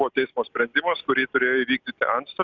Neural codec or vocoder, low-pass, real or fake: none; 7.2 kHz; real